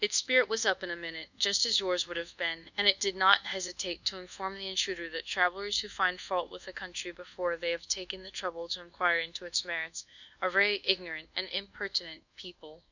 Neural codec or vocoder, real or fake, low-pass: codec, 24 kHz, 1.2 kbps, DualCodec; fake; 7.2 kHz